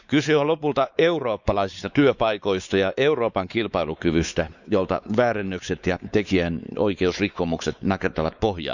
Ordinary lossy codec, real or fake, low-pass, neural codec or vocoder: none; fake; 7.2 kHz; codec, 16 kHz, 4 kbps, X-Codec, WavLM features, trained on Multilingual LibriSpeech